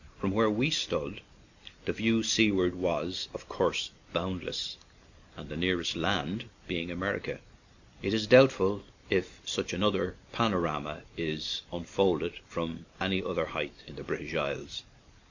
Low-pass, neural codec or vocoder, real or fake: 7.2 kHz; none; real